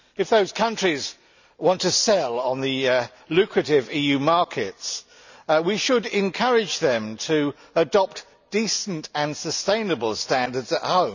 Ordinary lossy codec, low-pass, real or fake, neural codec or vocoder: none; 7.2 kHz; real; none